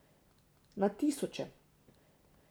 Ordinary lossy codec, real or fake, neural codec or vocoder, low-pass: none; real; none; none